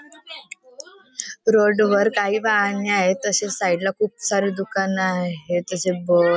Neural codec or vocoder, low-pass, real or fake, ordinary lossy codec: none; none; real; none